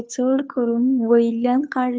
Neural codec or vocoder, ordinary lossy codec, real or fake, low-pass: codec, 16 kHz, 2 kbps, FunCodec, trained on Chinese and English, 25 frames a second; none; fake; none